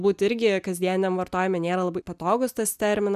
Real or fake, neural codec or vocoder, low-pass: real; none; 14.4 kHz